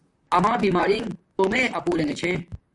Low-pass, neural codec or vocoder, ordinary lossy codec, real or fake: 10.8 kHz; vocoder, 44.1 kHz, 128 mel bands, Pupu-Vocoder; Opus, 64 kbps; fake